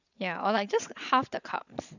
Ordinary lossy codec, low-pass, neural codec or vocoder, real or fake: none; 7.2 kHz; vocoder, 22.05 kHz, 80 mel bands, WaveNeXt; fake